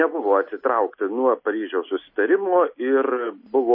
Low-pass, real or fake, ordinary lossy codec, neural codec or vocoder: 5.4 kHz; real; MP3, 24 kbps; none